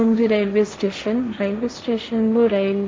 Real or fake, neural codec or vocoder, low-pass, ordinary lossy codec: fake; codec, 16 kHz, 1.1 kbps, Voila-Tokenizer; none; none